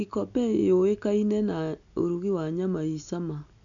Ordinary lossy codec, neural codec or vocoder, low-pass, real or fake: none; none; 7.2 kHz; real